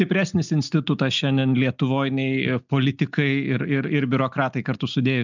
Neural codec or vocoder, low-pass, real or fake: none; 7.2 kHz; real